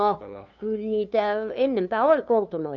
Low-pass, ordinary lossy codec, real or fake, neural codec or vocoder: 7.2 kHz; none; fake; codec, 16 kHz, 2 kbps, FunCodec, trained on LibriTTS, 25 frames a second